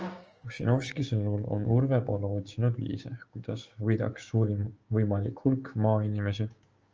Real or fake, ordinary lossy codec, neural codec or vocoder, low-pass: fake; Opus, 24 kbps; codec, 16 kHz, 6 kbps, DAC; 7.2 kHz